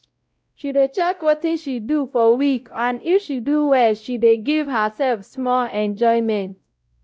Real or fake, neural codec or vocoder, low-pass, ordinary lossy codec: fake; codec, 16 kHz, 0.5 kbps, X-Codec, WavLM features, trained on Multilingual LibriSpeech; none; none